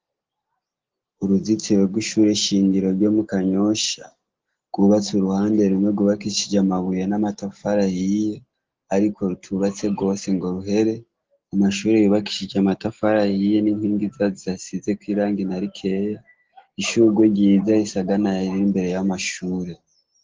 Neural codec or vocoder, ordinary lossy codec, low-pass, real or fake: none; Opus, 16 kbps; 7.2 kHz; real